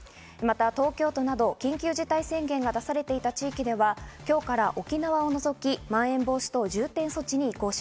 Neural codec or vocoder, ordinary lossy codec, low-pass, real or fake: none; none; none; real